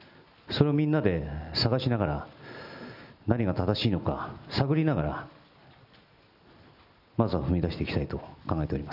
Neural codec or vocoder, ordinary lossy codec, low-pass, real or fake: none; none; 5.4 kHz; real